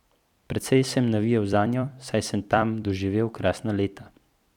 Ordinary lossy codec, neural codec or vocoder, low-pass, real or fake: none; vocoder, 44.1 kHz, 128 mel bands every 256 samples, BigVGAN v2; 19.8 kHz; fake